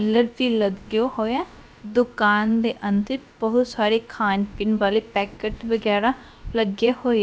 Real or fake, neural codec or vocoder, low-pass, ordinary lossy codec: fake; codec, 16 kHz, about 1 kbps, DyCAST, with the encoder's durations; none; none